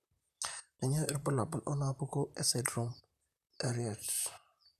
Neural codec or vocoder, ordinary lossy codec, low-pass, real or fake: vocoder, 44.1 kHz, 128 mel bands, Pupu-Vocoder; none; 14.4 kHz; fake